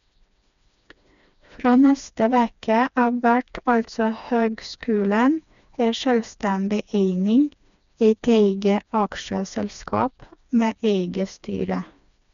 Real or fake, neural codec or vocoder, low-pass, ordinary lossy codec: fake; codec, 16 kHz, 2 kbps, FreqCodec, smaller model; 7.2 kHz; MP3, 96 kbps